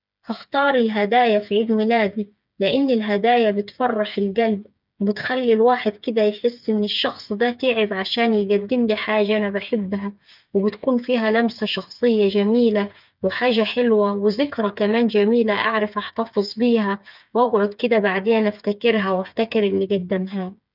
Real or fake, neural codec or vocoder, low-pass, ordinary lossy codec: fake; codec, 16 kHz, 8 kbps, FreqCodec, smaller model; 5.4 kHz; none